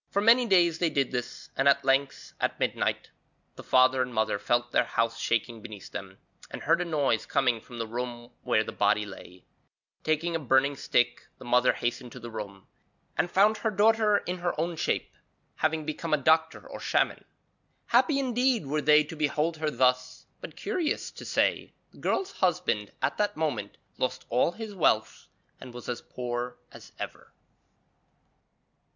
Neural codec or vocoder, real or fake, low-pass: none; real; 7.2 kHz